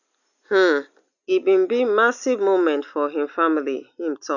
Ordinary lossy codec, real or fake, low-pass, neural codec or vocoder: none; real; 7.2 kHz; none